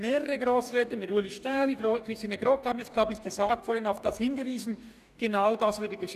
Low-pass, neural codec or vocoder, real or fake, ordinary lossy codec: 14.4 kHz; codec, 44.1 kHz, 2.6 kbps, DAC; fake; none